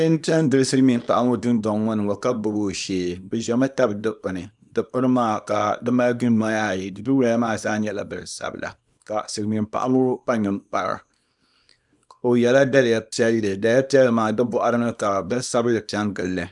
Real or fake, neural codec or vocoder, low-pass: fake; codec, 24 kHz, 0.9 kbps, WavTokenizer, small release; 10.8 kHz